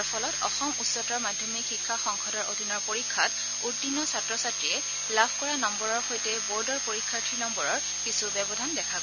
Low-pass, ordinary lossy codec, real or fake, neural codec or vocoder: 7.2 kHz; none; real; none